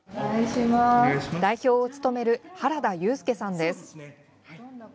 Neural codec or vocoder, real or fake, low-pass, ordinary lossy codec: none; real; none; none